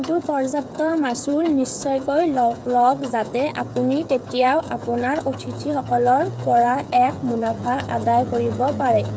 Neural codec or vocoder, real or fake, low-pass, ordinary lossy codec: codec, 16 kHz, 8 kbps, FreqCodec, smaller model; fake; none; none